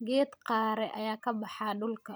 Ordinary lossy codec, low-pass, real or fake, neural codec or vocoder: none; none; fake; vocoder, 44.1 kHz, 128 mel bands every 256 samples, BigVGAN v2